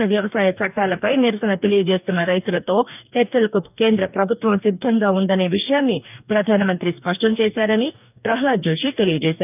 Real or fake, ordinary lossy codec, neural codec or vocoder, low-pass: fake; none; codec, 44.1 kHz, 2.6 kbps, DAC; 3.6 kHz